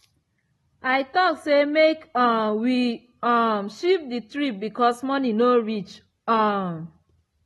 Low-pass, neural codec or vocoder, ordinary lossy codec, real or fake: 19.8 kHz; none; AAC, 32 kbps; real